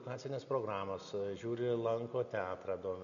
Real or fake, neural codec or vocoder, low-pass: real; none; 7.2 kHz